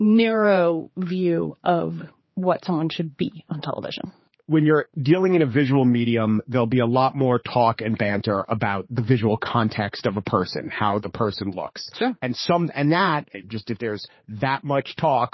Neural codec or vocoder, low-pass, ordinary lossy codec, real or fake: codec, 16 kHz, 4 kbps, X-Codec, HuBERT features, trained on general audio; 7.2 kHz; MP3, 24 kbps; fake